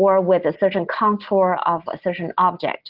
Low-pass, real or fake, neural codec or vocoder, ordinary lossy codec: 5.4 kHz; real; none; Opus, 16 kbps